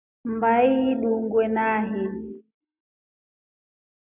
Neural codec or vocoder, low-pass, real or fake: none; 3.6 kHz; real